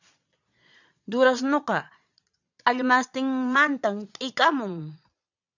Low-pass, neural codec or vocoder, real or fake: 7.2 kHz; vocoder, 22.05 kHz, 80 mel bands, Vocos; fake